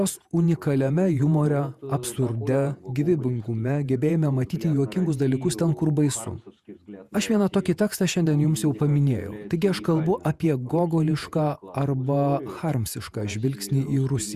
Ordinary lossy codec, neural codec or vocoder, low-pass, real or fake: Opus, 64 kbps; vocoder, 48 kHz, 128 mel bands, Vocos; 14.4 kHz; fake